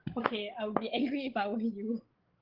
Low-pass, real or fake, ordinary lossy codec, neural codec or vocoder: 5.4 kHz; fake; Opus, 16 kbps; codec, 16 kHz, 16 kbps, FreqCodec, larger model